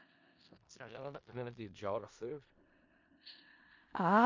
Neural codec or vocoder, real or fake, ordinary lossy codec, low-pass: codec, 16 kHz in and 24 kHz out, 0.4 kbps, LongCat-Audio-Codec, four codebook decoder; fake; MP3, 48 kbps; 7.2 kHz